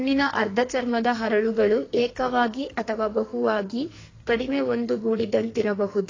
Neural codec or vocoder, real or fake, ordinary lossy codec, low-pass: codec, 44.1 kHz, 2.6 kbps, SNAC; fake; AAC, 32 kbps; 7.2 kHz